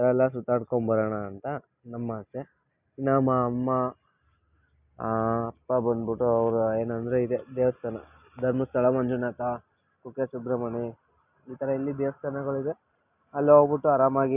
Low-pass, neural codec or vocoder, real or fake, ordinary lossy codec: 3.6 kHz; none; real; none